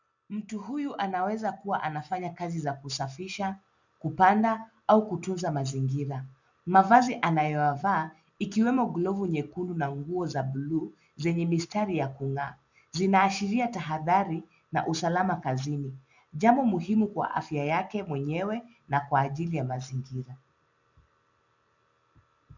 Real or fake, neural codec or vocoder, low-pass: real; none; 7.2 kHz